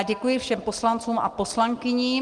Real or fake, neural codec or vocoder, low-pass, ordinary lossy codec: real; none; 10.8 kHz; Opus, 24 kbps